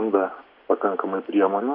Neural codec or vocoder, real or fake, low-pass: none; real; 5.4 kHz